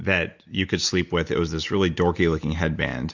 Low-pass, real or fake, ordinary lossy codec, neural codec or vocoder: 7.2 kHz; real; Opus, 64 kbps; none